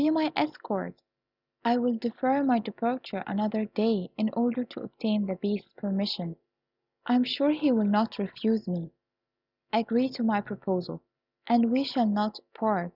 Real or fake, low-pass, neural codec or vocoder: real; 5.4 kHz; none